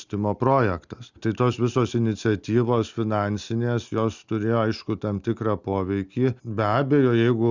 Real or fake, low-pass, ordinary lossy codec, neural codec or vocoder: real; 7.2 kHz; Opus, 64 kbps; none